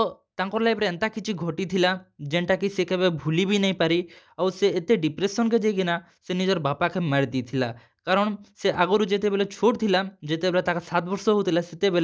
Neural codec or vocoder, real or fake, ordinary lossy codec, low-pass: none; real; none; none